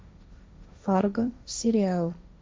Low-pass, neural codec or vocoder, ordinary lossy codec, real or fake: none; codec, 16 kHz, 1.1 kbps, Voila-Tokenizer; none; fake